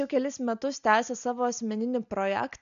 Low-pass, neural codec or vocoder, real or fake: 7.2 kHz; none; real